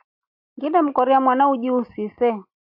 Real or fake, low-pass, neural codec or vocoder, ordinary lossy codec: real; 5.4 kHz; none; AAC, 48 kbps